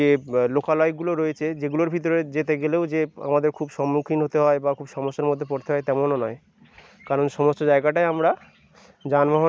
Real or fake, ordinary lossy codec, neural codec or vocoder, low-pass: real; none; none; none